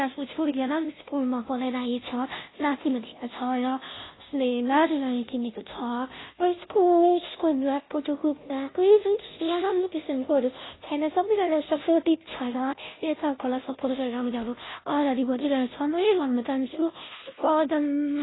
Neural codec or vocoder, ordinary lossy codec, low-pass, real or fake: codec, 16 kHz, 0.5 kbps, FunCodec, trained on Chinese and English, 25 frames a second; AAC, 16 kbps; 7.2 kHz; fake